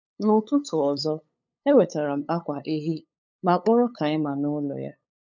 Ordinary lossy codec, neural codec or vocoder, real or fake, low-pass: none; codec, 16 kHz, 8 kbps, FunCodec, trained on LibriTTS, 25 frames a second; fake; 7.2 kHz